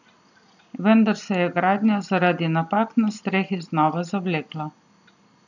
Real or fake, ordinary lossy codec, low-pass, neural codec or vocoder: real; none; 7.2 kHz; none